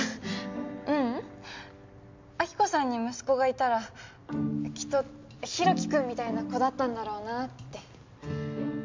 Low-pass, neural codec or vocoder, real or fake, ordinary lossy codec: 7.2 kHz; none; real; MP3, 64 kbps